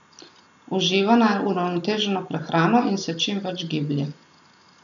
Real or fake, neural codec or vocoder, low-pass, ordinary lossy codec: real; none; 7.2 kHz; MP3, 96 kbps